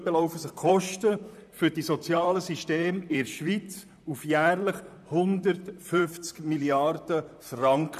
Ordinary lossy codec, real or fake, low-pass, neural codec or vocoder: none; fake; 14.4 kHz; vocoder, 44.1 kHz, 128 mel bands, Pupu-Vocoder